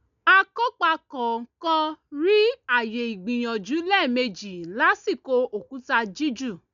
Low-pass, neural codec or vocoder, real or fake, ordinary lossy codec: 7.2 kHz; none; real; none